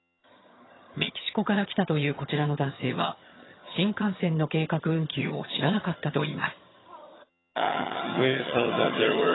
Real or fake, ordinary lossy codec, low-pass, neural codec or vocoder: fake; AAC, 16 kbps; 7.2 kHz; vocoder, 22.05 kHz, 80 mel bands, HiFi-GAN